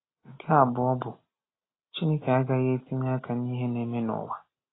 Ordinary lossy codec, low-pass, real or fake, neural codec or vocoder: AAC, 16 kbps; 7.2 kHz; real; none